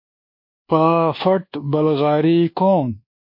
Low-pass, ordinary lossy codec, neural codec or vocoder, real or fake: 5.4 kHz; MP3, 32 kbps; codec, 24 kHz, 1.2 kbps, DualCodec; fake